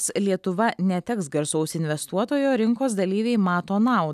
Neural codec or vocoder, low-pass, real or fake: none; 14.4 kHz; real